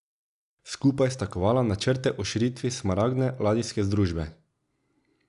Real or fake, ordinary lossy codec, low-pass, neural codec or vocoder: real; none; 10.8 kHz; none